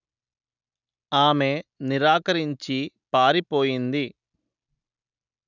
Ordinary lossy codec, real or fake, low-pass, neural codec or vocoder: none; real; 7.2 kHz; none